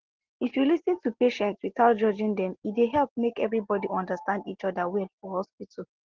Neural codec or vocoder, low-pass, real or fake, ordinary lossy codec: none; 7.2 kHz; real; Opus, 16 kbps